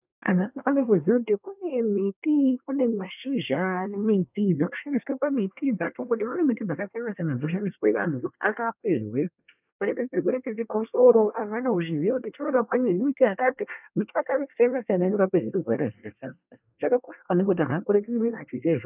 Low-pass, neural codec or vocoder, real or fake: 3.6 kHz; codec, 24 kHz, 1 kbps, SNAC; fake